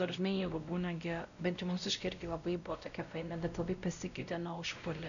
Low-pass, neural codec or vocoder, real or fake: 7.2 kHz; codec, 16 kHz, 0.5 kbps, X-Codec, WavLM features, trained on Multilingual LibriSpeech; fake